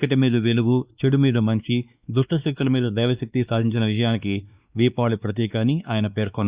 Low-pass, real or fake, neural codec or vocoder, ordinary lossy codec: 3.6 kHz; fake; codec, 16 kHz, 2 kbps, X-Codec, WavLM features, trained on Multilingual LibriSpeech; Opus, 64 kbps